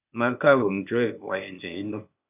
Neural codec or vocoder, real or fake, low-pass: codec, 16 kHz, 0.8 kbps, ZipCodec; fake; 3.6 kHz